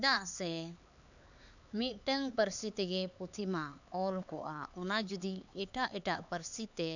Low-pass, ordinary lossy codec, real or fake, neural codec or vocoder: 7.2 kHz; none; fake; codec, 16 kHz, 4 kbps, X-Codec, HuBERT features, trained on LibriSpeech